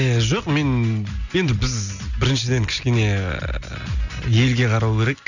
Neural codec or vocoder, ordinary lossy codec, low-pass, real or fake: none; none; 7.2 kHz; real